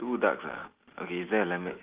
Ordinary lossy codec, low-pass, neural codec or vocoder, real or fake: Opus, 24 kbps; 3.6 kHz; none; real